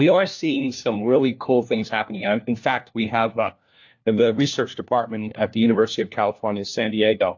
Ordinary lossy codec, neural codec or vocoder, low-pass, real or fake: AAC, 48 kbps; codec, 16 kHz, 1 kbps, FunCodec, trained on LibriTTS, 50 frames a second; 7.2 kHz; fake